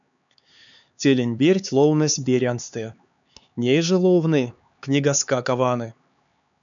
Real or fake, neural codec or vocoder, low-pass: fake; codec, 16 kHz, 4 kbps, X-Codec, HuBERT features, trained on LibriSpeech; 7.2 kHz